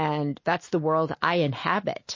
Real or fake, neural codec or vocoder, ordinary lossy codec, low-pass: real; none; MP3, 32 kbps; 7.2 kHz